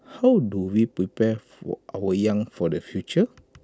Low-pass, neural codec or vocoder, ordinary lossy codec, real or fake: none; none; none; real